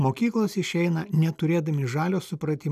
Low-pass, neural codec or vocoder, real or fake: 14.4 kHz; none; real